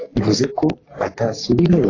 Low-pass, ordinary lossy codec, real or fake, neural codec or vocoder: 7.2 kHz; AAC, 32 kbps; fake; codec, 44.1 kHz, 3.4 kbps, Pupu-Codec